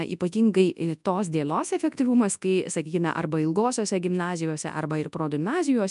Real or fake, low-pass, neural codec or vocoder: fake; 10.8 kHz; codec, 24 kHz, 0.9 kbps, WavTokenizer, large speech release